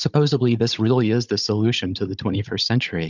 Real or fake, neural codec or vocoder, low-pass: fake; codec, 16 kHz, 16 kbps, FunCodec, trained on Chinese and English, 50 frames a second; 7.2 kHz